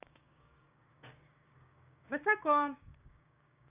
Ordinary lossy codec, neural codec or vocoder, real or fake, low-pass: none; codec, 16 kHz, 6 kbps, DAC; fake; 3.6 kHz